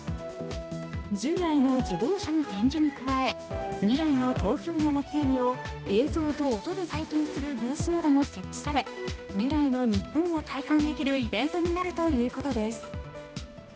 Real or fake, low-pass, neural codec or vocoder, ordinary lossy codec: fake; none; codec, 16 kHz, 1 kbps, X-Codec, HuBERT features, trained on balanced general audio; none